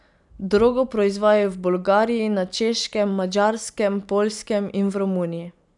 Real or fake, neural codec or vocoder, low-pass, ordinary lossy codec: real; none; 10.8 kHz; none